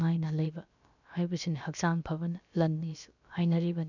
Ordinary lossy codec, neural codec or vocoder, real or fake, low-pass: none; codec, 16 kHz, about 1 kbps, DyCAST, with the encoder's durations; fake; 7.2 kHz